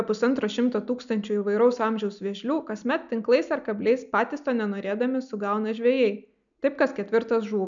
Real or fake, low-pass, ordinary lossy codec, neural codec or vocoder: real; 7.2 kHz; MP3, 96 kbps; none